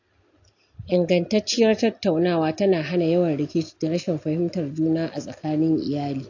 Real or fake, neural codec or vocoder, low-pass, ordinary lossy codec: real; none; 7.2 kHz; AAC, 48 kbps